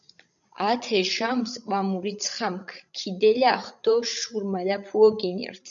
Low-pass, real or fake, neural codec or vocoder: 7.2 kHz; fake; codec, 16 kHz, 16 kbps, FreqCodec, larger model